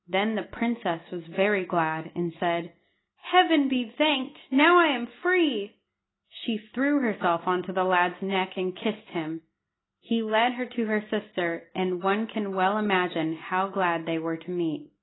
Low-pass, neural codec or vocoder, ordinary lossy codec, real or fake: 7.2 kHz; none; AAC, 16 kbps; real